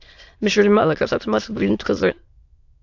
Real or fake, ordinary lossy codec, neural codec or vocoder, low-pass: fake; AAC, 48 kbps; autoencoder, 22.05 kHz, a latent of 192 numbers a frame, VITS, trained on many speakers; 7.2 kHz